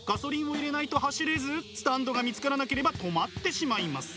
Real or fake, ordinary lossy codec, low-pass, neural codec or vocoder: real; none; none; none